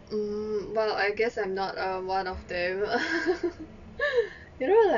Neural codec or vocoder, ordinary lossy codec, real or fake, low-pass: none; none; real; 7.2 kHz